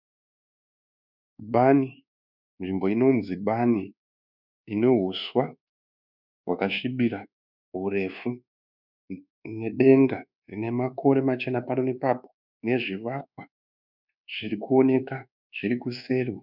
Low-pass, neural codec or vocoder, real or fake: 5.4 kHz; codec, 24 kHz, 1.2 kbps, DualCodec; fake